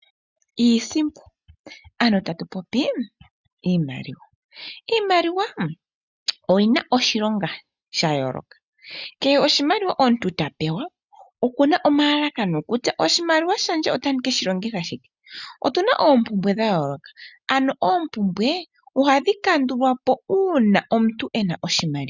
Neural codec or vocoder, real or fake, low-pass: none; real; 7.2 kHz